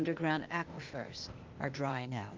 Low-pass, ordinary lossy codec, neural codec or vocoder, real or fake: 7.2 kHz; Opus, 32 kbps; codec, 16 kHz, 0.8 kbps, ZipCodec; fake